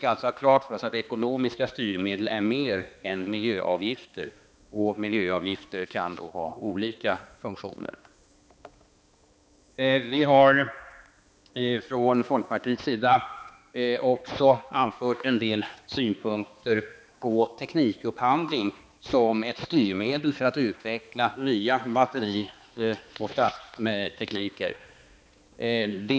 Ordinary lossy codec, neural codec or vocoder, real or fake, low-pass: none; codec, 16 kHz, 2 kbps, X-Codec, HuBERT features, trained on balanced general audio; fake; none